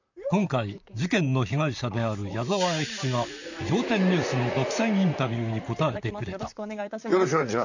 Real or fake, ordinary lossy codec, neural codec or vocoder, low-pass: fake; none; vocoder, 44.1 kHz, 128 mel bands, Pupu-Vocoder; 7.2 kHz